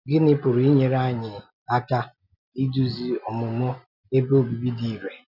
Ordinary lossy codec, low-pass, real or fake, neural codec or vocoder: none; 5.4 kHz; real; none